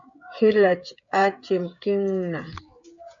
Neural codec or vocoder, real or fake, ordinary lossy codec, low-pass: codec, 16 kHz, 16 kbps, FreqCodec, smaller model; fake; MP3, 48 kbps; 7.2 kHz